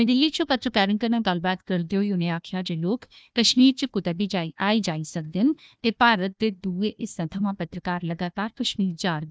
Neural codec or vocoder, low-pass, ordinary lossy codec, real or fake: codec, 16 kHz, 1 kbps, FunCodec, trained on Chinese and English, 50 frames a second; none; none; fake